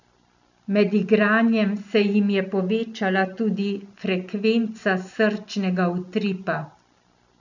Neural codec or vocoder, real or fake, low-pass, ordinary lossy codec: none; real; 7.2 kHz; none